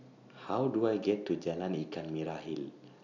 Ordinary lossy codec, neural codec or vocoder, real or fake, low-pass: none; none; real; 7.2 kHz